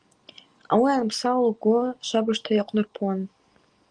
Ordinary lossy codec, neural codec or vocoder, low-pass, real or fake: Opus, 24 kbps; none; 9.9 kHz; real